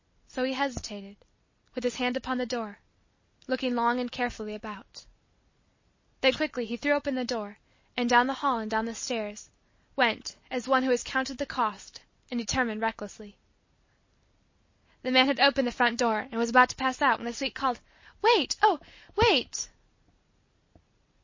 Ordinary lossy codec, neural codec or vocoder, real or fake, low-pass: MP3, 32 kbps; none; real; 7.2 kHz